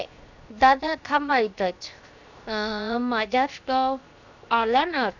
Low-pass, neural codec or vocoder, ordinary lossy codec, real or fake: 7.2 kHz; codec, 16 kHz, 0.7 kbps, FocalCodec; none; fake